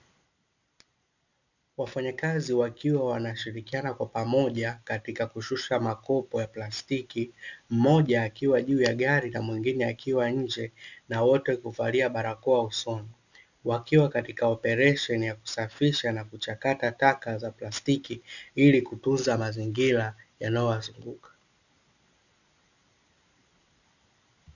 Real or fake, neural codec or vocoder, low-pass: real; none; 7.2 kHz